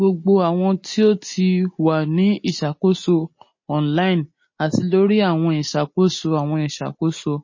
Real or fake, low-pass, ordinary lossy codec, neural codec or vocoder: real; 7.2 kHz; MP3, 32 kbps; none